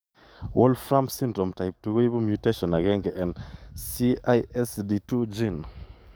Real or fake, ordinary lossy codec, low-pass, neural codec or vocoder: fake; none; none; codec, 44.1 kHz, 7.8 kbps, DAC